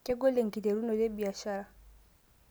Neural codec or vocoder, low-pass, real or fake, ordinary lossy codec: none; none; real; none